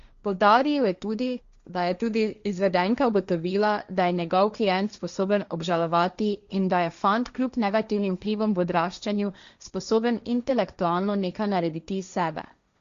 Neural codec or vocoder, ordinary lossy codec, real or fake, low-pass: codec, 16 kHz, 1.1 kbps, Voila-Tokenizer; Opus, 64 kbps; fake; 7.2 kHz